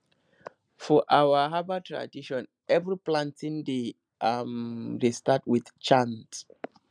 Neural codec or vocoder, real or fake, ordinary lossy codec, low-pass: none; real; none; 9.9 kHz